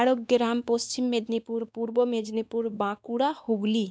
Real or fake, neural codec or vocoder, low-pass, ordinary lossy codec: fake; codec, 16 kHz, 2 kbps, X-Codec, WavLM features, trained on Multilingual LibriSpeech; none; none